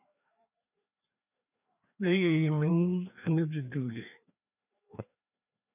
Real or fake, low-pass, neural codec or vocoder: fake; 3.6 kHz; codec, 16 kHz, 2 kbps, FreqCodec, larger model